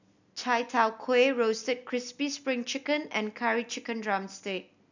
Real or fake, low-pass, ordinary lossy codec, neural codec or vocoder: real; 7.2 kHz; none; none